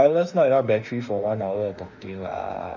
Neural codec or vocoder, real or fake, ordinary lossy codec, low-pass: autoencoder, 48 kHz, 32 numbers a frame, DAC-VAE, trained on Japanese speech; fake; none; 7.2 kHz